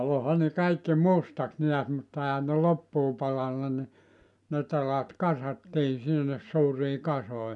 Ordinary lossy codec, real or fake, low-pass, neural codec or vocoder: none; real; 10.8 kHz; none